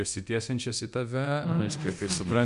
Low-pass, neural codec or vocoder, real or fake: 10.8 kHz; codec, 24 kHz, 0.9 kbps, DualCodec; fake